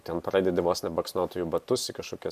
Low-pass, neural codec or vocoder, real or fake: 14.4 kHz; none; real